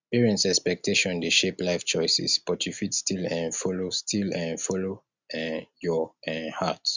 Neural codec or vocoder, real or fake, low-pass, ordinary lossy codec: none; real; 7.2 kHz; Opus, 64 kbps